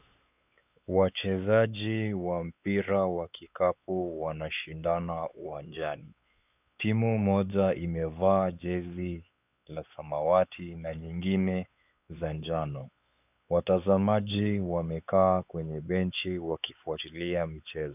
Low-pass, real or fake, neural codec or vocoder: 3.6 kHz; fake; codec, 16 kHz, 4 kbps, X-Codec, WavLM features, trained on Multilingual LibriSpeech